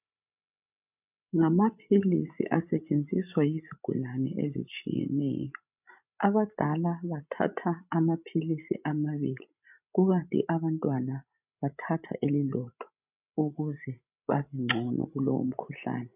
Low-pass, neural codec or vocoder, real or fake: 3.6 kHz; codec, 16 kHz, 16 kbps, FreqCodec, larger model; fake